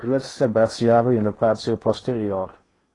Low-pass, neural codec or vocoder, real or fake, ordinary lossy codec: 10.8 kHz; codec, 16 kHz in and 24 kHz out, 0.8 kbps, FocalCodec, streaming, 65536 codes; fake; AAC, 32 kbps